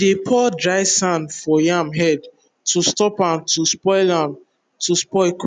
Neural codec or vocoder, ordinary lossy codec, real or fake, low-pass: none; none; real; 9.9 kHz